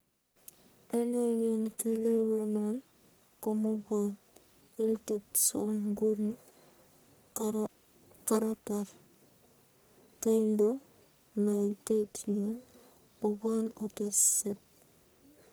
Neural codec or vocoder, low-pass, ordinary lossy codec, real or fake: codec, 44.1 kHz, 1.7 kbps, Pupu-Codec; none; none; fake